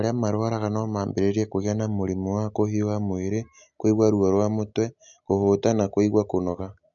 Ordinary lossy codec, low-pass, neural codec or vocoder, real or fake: none; 7.2 kHz; none; real